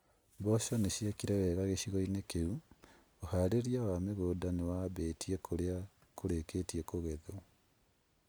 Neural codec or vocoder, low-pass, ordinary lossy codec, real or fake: none; none; none; real